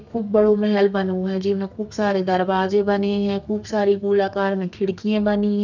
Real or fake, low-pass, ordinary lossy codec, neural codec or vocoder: fake; 7.2 kHz; none; codec, 32 kHz, 1.9 kbps, SNAC